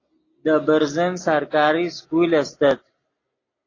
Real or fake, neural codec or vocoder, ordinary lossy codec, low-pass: real; none; AAC, 32 kbps; 7.2 kHz